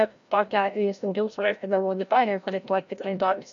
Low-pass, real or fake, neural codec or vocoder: 7.2 kHz; fake; codec, 16 kHz, 0.5 kbps, FreqCodec, larger model